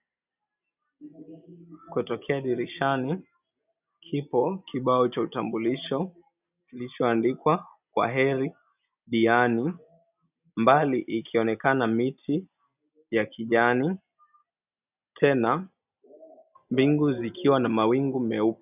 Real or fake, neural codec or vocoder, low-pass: real; none; 3.6 kHz